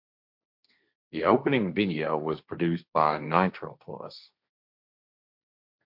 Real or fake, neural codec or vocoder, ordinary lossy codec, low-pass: fake; codec, 16 kHz, 1.1 kbps, Voila-Tokenizer; MP3, 48 kbps; 5.4 kHz